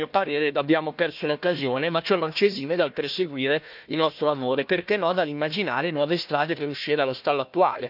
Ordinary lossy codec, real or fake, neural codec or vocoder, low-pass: none; fake; codec, 16 kHz, 1 kbps, FunCodec, trained on Chinese and English, 50 frames a second; 5.4 kHz